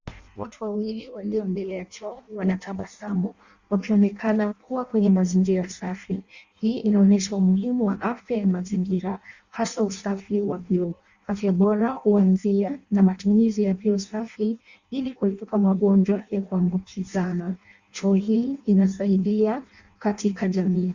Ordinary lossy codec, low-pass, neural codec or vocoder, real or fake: Opus, 64 kbps; 7.2 kHz; codec, 16 kHz in and 24 kHz out, 0.6 kbps, FireRedTTS-2 codec; fake